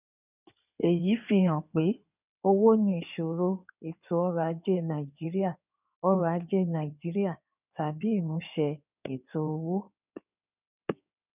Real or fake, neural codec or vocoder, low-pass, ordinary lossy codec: fake; codec, 16 kHz in and 24 kHz out, 2.2 kbps, FireRedTTS-2 codec; 3.6 kHz; none